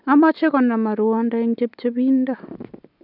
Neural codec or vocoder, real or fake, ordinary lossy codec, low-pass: none; real; none; 5.4 kHz